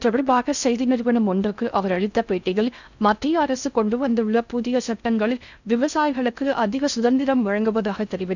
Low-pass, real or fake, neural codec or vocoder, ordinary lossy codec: 7.2 kHz; fake; codec, 16 kHz in and 24 kHz out, 0.6 kbps, FocalCodec, streaming, 4096 codes; none